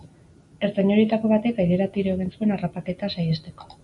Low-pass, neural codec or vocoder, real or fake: 10.8 kHz; none; real